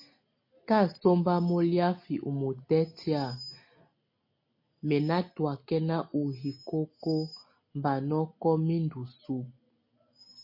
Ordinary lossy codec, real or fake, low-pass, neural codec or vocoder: MP3, 32 kbps; real; 5.4 kHz; none